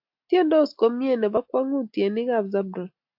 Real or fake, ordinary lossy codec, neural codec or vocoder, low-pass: real; AAC, 48 kbps; none; 5.4 kHz